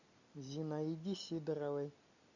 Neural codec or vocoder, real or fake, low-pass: none; real; 7.2 kHz